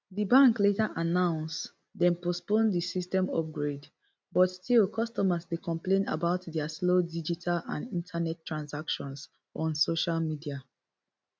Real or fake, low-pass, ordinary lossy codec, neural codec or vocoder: real; none; none; none